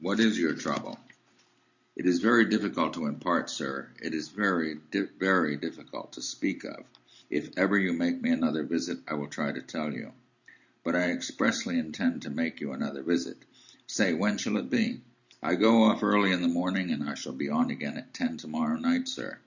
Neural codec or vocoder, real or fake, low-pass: none; real; 7.2 kHz